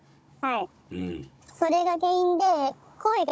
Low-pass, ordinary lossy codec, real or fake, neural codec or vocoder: none; none; fake; codec, 16 kHz, 16 kbps, FunCodec, trained on Chinese and English, 50 frames a second